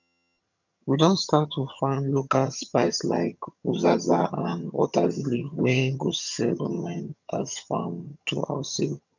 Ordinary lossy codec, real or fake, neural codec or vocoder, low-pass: none; fake; vocoder, 22.05 kHz, 80 mel bands, HiFi-GAN; 7.2 kHz